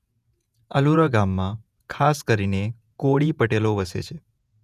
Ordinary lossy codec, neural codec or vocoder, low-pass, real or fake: none; vocoder, 48 kHz, 128 mel bands, Vocos; 14.4 kHz; fake